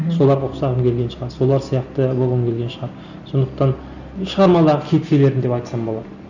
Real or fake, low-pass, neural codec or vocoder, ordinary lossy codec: real; 7.2 kHz; none; none